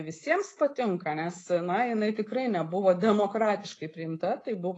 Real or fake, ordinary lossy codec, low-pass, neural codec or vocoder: fake; AAC, 32 kbps; 10.8 kHz; codec, 24 kHz, 3.1 kbps, DualCodec